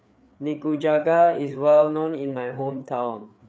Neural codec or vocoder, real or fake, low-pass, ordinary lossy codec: codec, 16 kHz, 4 kbps, FreqCodec, larger model; fake; none; none